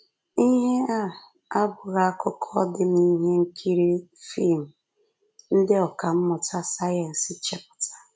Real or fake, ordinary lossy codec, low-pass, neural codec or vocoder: real; none; none; none